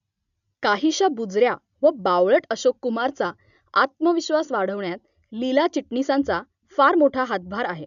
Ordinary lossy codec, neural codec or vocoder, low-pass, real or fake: none; none; 7.2 kHz; real